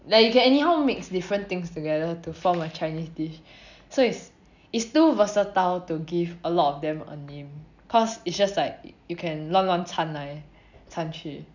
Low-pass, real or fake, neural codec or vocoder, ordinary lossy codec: 7.2 kHz; real; none; none